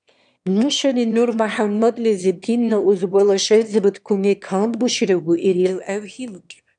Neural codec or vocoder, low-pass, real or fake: autoencoder, 22.05 kHz, a latent of 192 numbers a frame, VITS, trained on one speaker; 9.9 kHz; fake